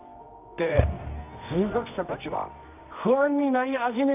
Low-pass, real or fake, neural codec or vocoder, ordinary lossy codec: 3.6 kHz; fake; codec, 24 kHz, 0.9 kbps, WavTokenizer, medium music audio release; none